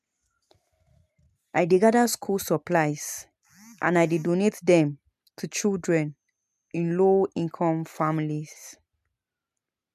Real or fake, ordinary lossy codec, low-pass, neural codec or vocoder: real; MP3, 96 kbps; 14.4 kHz; none